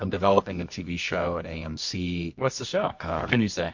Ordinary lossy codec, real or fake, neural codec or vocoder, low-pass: MP3, 48 kbps; fake; codec, 24 kHz, 0.9 kbps, WavTokenizer, medium music audio release; 7.2 kHz